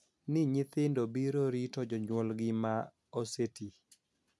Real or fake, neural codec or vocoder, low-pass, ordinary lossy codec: real; none; none; none